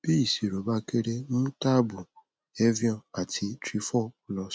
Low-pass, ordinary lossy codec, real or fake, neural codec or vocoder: none; none; real; none